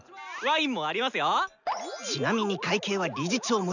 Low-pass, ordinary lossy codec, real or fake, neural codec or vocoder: 7.2 kHz; none; real; none